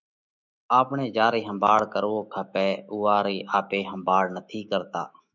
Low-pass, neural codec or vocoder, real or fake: 7.2 kHz; autoencoder, 48 kHz, 128 numbers a frame, DAC-VAE, trained on Japanese speech; fake